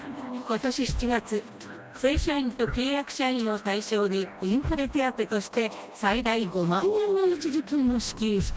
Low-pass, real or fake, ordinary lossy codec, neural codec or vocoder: none; fake; none; codec, 16 kHz, 1 kbps, FreqCodec, smaller model